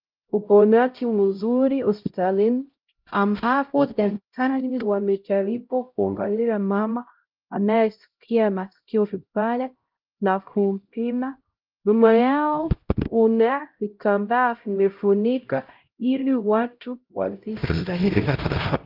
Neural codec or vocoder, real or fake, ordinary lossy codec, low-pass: codec, 16 kHz, 0.5 kbps, X-Codec, HuBERT features, trained on LibriSpeech; fake; Opus, 32 kbps; 5.4 kHz